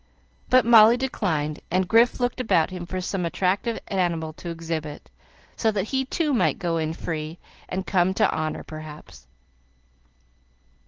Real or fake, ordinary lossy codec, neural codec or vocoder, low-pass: real; Opus, 16 kbps; none; 7.2 kHz